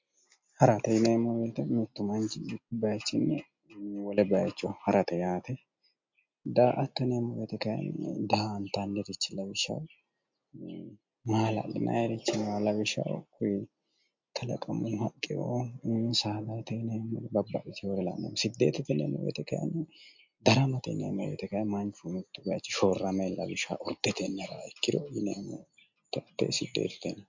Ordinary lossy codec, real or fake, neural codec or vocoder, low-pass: MP3, 48 kbps; real; none; 7.2 kHz